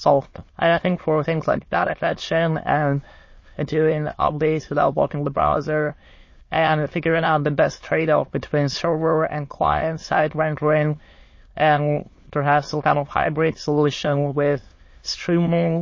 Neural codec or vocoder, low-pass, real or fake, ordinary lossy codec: autoencoder, 22.05 kHz, a latent of 192 numbers a frame, VITS, trained on many speakers; 7.2 kHz; fake; MP3, 32 kbps